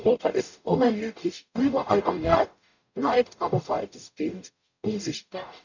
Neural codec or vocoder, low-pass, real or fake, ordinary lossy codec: codec, 44.1 kHz, 0.9 kbps, DAC; 7.2 kHz; fake; none